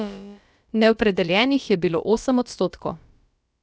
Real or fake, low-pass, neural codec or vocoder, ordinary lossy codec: fake; none; codec, 16 kHz, about 1 kbps, DyCAST, with the encoder's durations; none